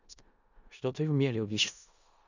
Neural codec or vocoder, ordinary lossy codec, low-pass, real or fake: codec, 16 kHz in and 24 kHz out, 0.4 kbps, LongCat-Audio-Codec, four codebook decoder; none; 7.2 kHz; fake